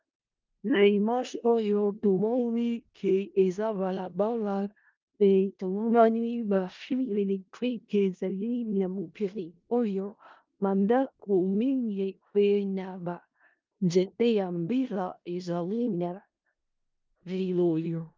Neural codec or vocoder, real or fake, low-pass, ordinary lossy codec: codec, 16 kHz in and 24 kHz out, 0.4 kbps, LongCat-Audio-Codec, four codebook decoder; fake; 7.2 kHz; Opus, 24 kbps